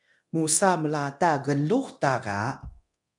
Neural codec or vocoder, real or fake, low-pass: codec, 24 kHz, 0.9 kbps, DualCodec; fake; 10.8 kHz